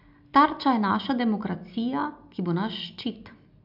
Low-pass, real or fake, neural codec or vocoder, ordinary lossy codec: 5.4 kHz; real; none; AAC, 48 kbps